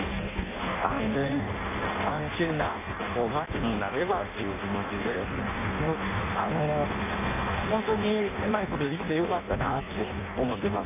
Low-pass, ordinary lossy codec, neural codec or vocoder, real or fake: 3.6 kHz; none; codec, 16 kHz in and 24 kHz out, 0.6 kbps, FireRedTTS-2 codec; fake